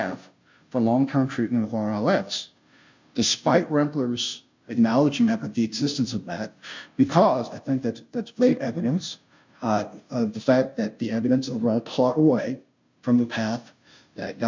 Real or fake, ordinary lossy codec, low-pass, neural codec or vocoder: fake; MP3, 64 kbps; 7.2 kHz; codec, 16 kHz, 0.5 kbps, FunCodec, trained on Chinese and English, 25 frames a second